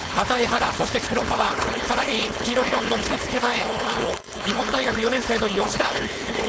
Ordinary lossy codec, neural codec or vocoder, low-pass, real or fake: none; codec, 16 kHz, 4.8 kbps, FACodec; none; fake